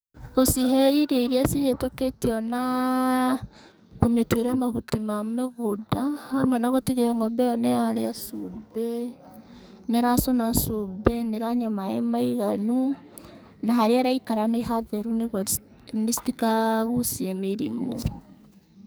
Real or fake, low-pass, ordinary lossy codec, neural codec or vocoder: fake; none; none; codec, 44.1 kHz, 2.6 kbps, SNAC